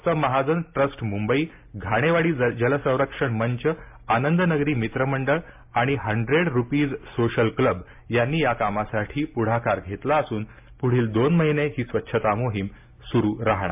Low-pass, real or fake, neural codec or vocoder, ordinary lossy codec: 3.6 kHz; real; none; MP3, 32 kbps